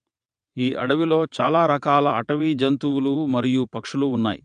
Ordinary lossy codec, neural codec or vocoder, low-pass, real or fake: AAC, 96 kbps; vocoder, 22.05 kHz, 80 mel bands, WaveNeXt; 9.9 kHz; fake